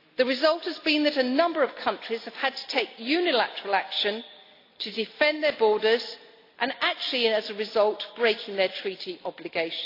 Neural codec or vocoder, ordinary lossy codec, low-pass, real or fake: none; AAC, 32 kbps; 5.4 kHz; real